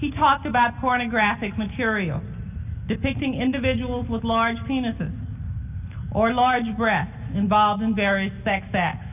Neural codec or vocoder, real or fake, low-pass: none; real; 3.6 kHz